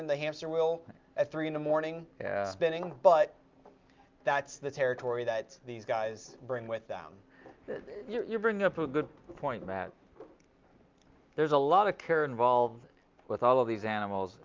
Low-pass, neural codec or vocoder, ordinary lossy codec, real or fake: 7.2 kHz; none; Opus, 24 kbps; real